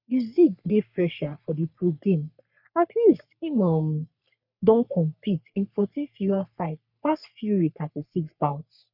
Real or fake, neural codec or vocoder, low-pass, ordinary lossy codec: fake; codec, 44.1 kHz, 3.4 kbps, Pupu-Codec; 5.4 kHz; none